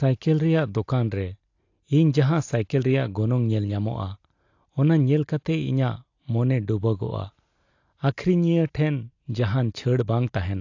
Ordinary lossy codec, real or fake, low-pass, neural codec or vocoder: AAC, 48 kbps; real; 7.2 kHz; none